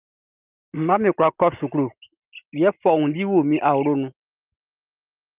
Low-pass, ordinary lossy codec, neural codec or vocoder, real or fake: 3.6 kHz; Opus, 24 kbps; none; real